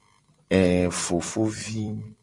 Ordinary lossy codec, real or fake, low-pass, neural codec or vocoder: Opus, 64 kbps; fake; 10.8 kHz; vocoder, 44.1 kHz, 128 mel bands every 256 samples, BigVGAN v2